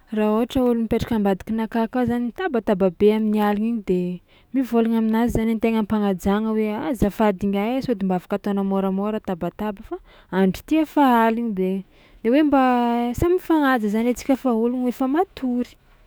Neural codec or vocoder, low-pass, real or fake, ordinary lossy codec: autoencoder, 48 kHz, 128 numbers a frame, DAC-VAE, trained on Japanese speech; none; fake; none